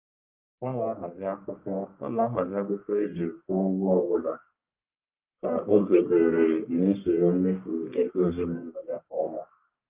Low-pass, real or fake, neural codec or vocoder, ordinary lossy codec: 3.6 kHz; fake; codec, 44.1 kHz, 1.7 kbps, Pupu-Codec; Opus, 24 kbps